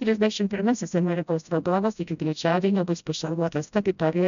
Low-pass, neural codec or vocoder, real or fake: 7.2 kHz; codec, 16 kHz, 0.5 kbps, FreqCodec, smaller model; fake